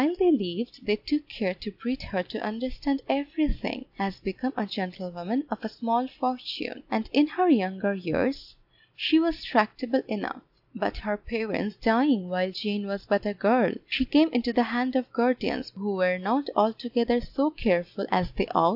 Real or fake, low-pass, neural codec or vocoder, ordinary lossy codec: real; 5.4 kHz; none; AAC, 48 kbps